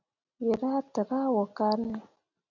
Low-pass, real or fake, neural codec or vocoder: 7.2 kHz; real; none